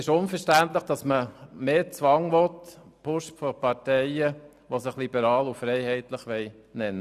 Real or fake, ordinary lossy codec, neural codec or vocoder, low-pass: real; none; none; 14.4 kHz